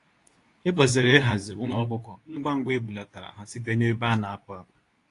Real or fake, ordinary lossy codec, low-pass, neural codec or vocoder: fake; AAC, 48 kbps; 10.8 kHz; codec, 24 kHz, 0.9 kbps, WavTokenizer, medium speech release version 2